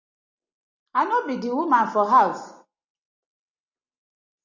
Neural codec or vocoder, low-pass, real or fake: none; 7.2 kHz; real